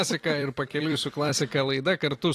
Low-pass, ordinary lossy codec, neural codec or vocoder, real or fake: 14.4 kHz; AAC, 48 kbps; vocoder, 44.1 kHz, 128 mel bands every 256 samples, BigVGAN v2; fake